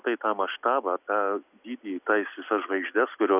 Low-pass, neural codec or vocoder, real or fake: 3.6 kHz; none; real